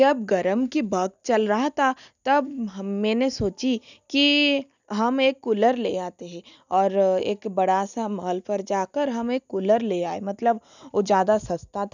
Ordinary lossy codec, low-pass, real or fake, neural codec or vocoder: none; 7.2 kHz; real; none